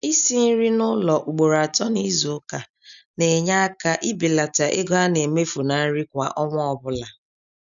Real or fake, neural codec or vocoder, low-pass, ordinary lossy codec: real; none; 7.2 kHz; MP3, 96 kbps